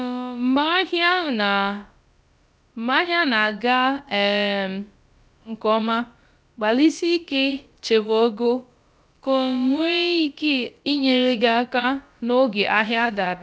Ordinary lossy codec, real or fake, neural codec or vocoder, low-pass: none; fake; codec, 16 kHz, about 1 kbps, DyCAST, with the encoder's durations; none